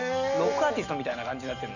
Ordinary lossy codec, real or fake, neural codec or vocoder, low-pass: none; real; none; 7.2 kHz